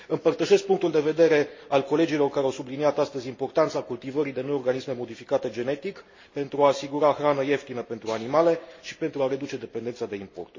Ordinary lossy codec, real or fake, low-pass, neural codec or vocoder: MP3, 32 kbps; real; 7.2 kHz; none